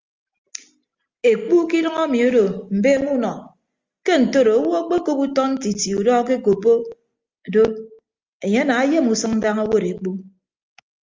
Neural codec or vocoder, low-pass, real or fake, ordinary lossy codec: none; 7.2 kHz; real; Opus, 32 kbps